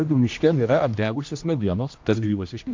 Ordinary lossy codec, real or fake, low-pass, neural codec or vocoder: MP3, 48 kbps; fake; 7.2 kHz; codec, 16 kHz, 1 kbps, X-Codec, HuBERT features, trained on general audio